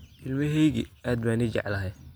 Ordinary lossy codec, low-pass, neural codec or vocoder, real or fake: none; none; none; real